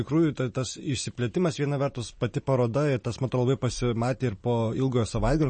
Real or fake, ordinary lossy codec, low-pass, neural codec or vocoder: real; MP3, 32 kbps; 10.8 kHz; none